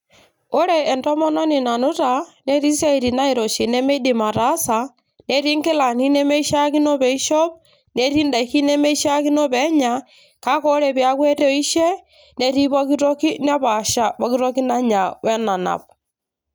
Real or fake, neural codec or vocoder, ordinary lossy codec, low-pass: real; none; none; none